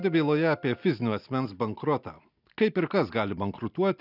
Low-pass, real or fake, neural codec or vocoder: 5.4 kHz; real; none